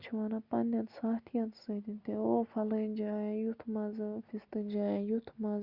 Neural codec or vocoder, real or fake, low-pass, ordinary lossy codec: none; real; 5.4 kHz; Opus, 64 kbps